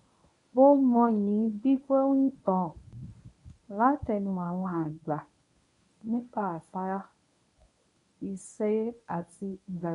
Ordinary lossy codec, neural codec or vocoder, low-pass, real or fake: none; codec, 24 kHz, 0.9 kbps, WavTokenizer, medium speech release version 1; 10.8 kHz; fake